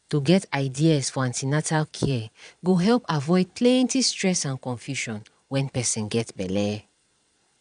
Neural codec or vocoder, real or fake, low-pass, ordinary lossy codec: vocoder, 22.05 kHz, 80 mel bands, Vocos; fake; 9.9 kHz; none